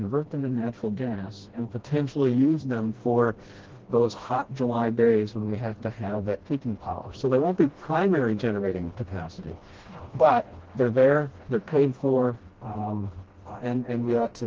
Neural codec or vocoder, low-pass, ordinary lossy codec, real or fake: codec, 16 kHz, 1 kbps, FreqCodec, smaller model; 7.2 kHz; Opus, 16 kbps; fake